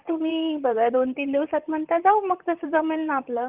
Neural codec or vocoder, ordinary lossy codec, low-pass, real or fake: vocoder, 44.1 kHz, 128 mel bands, Pupu-Vocoder; Opus, 32 kbps; 3.6 kHz; fake